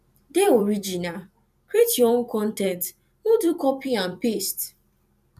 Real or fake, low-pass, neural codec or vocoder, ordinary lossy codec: fake; 14.4 kHz; vocoder, 44.1 kHz, 128 mel bands every 256 samples, BigVGAN v2; none